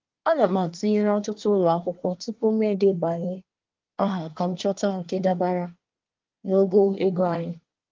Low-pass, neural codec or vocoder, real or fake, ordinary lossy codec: 7.2 kHz; codec, 24 kHz, 1 kbps, SNAC; fake; Opus, 32 kbps